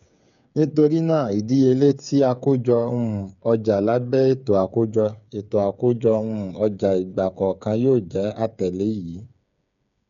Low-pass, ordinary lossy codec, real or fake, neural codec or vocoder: 7.2 kHz; none; fake; codec, 16 kHz, 8 kbps, FreqCodec, smaller model